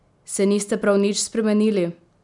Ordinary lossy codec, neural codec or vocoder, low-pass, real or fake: none; none; 10.8 kHz; real